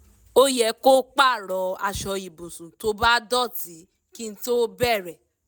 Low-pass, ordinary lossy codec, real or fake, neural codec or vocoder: none; none; real; none